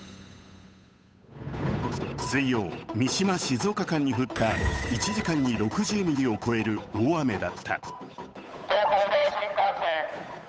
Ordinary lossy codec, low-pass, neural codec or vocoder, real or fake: none; none; codec, 16 kHz, 8 kbps, FunCodec, trained on Chinese and English, 25 frames a second; fake